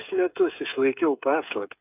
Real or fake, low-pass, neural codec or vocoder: fake; 3.6 kHz; codec, 16 kHz, 8 kbps, FreqCodec, smaller model